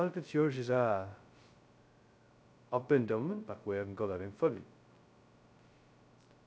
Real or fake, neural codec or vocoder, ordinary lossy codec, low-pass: fake; codec, 16 kHz, 0.2 kbps, FocalCodec; none; none